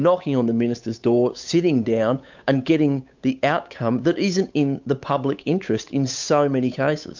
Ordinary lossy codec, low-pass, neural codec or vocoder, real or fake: MP3, 64 kbps; 7.2 kHz; codec, 16 kHz, 8 kbps, FunCodec, trained on Chinese and English, 25 frames a second; fake